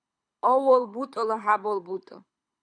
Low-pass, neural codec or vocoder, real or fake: 9.9 kHz; codec, 24 kHz, 6 kbps, HILCodec; fake